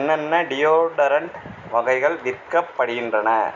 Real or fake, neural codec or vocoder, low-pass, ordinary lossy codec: real; none; 7.2 kHz; none